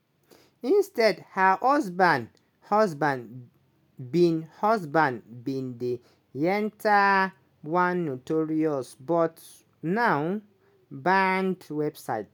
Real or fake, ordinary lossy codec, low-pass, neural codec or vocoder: real; none; none; none